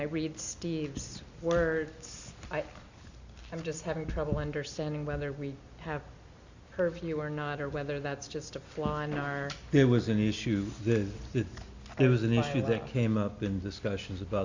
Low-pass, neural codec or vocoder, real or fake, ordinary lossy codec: 7.2 kHz; none; real; Opus, 64 kbps